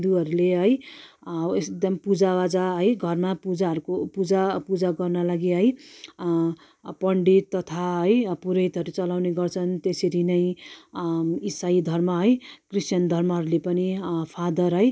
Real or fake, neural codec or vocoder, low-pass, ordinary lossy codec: real; none; none; none